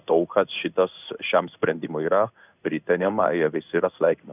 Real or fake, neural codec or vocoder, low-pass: fake; codec, 16 kHz in and 24 kHz out, 1 kbps, XY-Tokenizer; 3.6 kHz